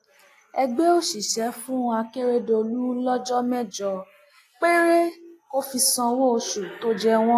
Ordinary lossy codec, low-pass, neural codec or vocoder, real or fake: AAC, 64 kbps; 14.4 kHz; none; real